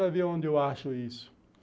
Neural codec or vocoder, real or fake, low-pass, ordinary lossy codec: none; real; none; none